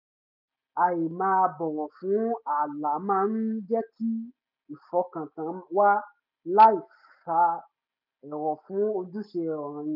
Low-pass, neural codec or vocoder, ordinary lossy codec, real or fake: 5.4 kHz; none; none; real